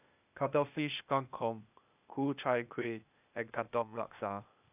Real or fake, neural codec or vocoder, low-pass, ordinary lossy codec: fake; codec, 16 kHz, 0.8 kbps, ZipCodec; 3.6 kHz; none